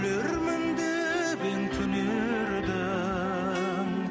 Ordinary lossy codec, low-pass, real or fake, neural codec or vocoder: none; none; real; none